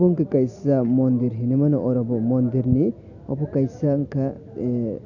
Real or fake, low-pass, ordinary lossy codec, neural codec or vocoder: real; 7.2 kHz; none; none